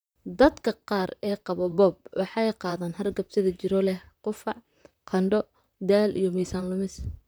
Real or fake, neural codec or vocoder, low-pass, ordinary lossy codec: fake; vocoder, 44.1 kHz, 128 mel bands, Pupu-Vocoder; none; none